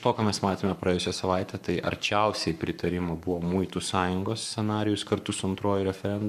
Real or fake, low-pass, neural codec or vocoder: fake; 14.4 kHz; codec, 44.1 kHz, 7.8 kbps, Pupu-Codec